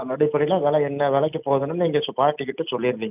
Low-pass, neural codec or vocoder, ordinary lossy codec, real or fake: 3.6 kHz; none; none; real